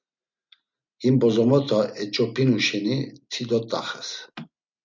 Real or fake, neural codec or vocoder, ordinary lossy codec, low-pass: real; none; MP3, 64 kbps; 7.2 kHz